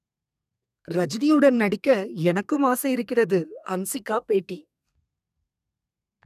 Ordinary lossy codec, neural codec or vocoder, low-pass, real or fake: none; codec, 32 kHz, 1.9 kbps, SNAC; 14.4 kHz; fake